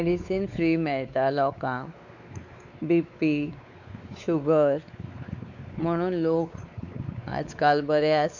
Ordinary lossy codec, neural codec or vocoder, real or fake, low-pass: none; codec, 16 kHz, 4 kbps, X-Codec, WavLM features, trained on Multilingual LibriSpeech; fake; 7.2 kHz